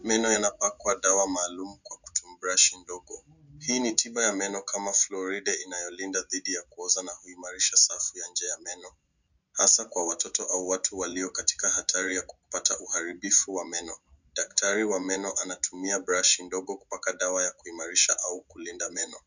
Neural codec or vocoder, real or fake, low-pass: none; real; 7.2 kHz